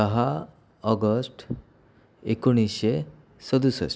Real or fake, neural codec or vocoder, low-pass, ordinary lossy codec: real; none; none; none